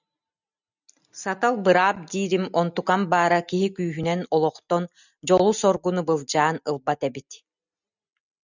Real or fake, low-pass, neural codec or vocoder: real; 7.2 kHz; none